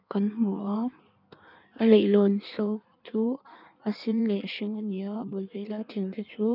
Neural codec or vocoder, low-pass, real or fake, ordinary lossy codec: codec, 16 kHz in and 24 kHz out, 1.1 kbps, FireRedTTS-2 codec; 5.4 kHz; fake; none